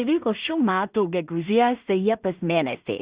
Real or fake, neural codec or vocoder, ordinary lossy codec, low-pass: fake; codec, 16 kHz in and 24 kHz out, 0.4 kbps, LongCat-Audio-Codec, two codebook decoder; Opus, 64 kbps; 3.6 kHz